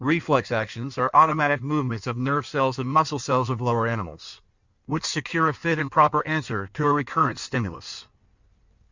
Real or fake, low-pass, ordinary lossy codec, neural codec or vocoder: fake; 7.2 kHz; Opus, 64 kbps; codec, 16 kHz in and 24 kHz out, 1.1 kbps, FireRedTTS-2 codec